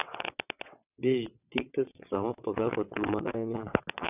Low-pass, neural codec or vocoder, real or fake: 3.6 kHz; vocoder, 22.05 kHz, 80 mel bands, WaveNeXt; fake